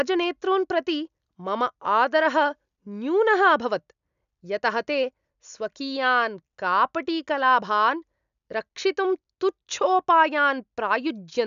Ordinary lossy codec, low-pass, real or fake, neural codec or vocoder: none; 7.2 kHz; real; none